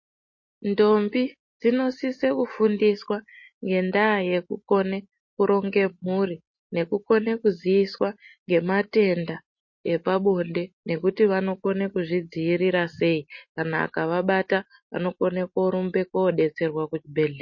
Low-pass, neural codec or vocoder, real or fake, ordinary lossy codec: 7.2 kHz; none; real; MP3, 32 kbps